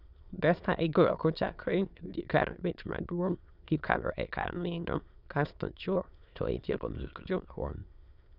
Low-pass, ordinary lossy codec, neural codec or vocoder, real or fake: 5.4 kHz; none; autoencoder, 22.05 kHz, a latent of 192 numbers a frame, VITS, trained on many speakers; fake